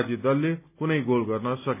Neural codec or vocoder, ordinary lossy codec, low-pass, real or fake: none; none; 3.6 kHz; real